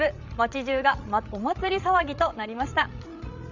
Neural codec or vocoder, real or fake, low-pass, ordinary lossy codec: codec, 16 kHz, 16 kbps, FreqCodec, larger model; fake; 7.2 kHz; none